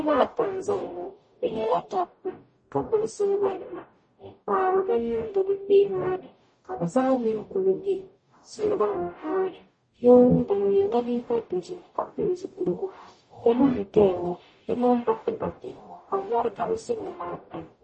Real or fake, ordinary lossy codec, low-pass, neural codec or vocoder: fake; MP3, 32 kbps; 9.9 kHz; codec, 44.1 kHz, 0.9 kbps, DAC